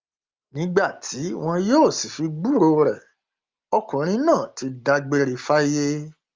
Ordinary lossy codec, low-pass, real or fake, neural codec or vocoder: Opus, 32 kbps; 7.2 kHz; real; none